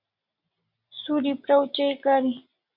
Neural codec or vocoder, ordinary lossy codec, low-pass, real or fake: none; Opus, 64 kbps; 5.4 kHz; real